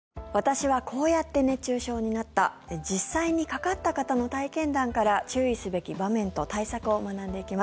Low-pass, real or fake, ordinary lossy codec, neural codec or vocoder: none; real; none; none